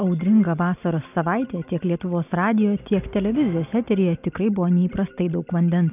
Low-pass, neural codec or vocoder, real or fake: 3.6 kHz; none; real